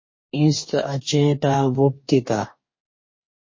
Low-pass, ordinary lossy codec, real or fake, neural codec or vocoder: 7.2 kHz; MP3, 32 kbps; fake; codec, 44.1 kHz, 2.6 kbps, DAC